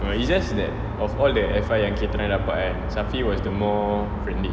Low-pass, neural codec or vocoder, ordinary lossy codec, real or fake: none; none; none; real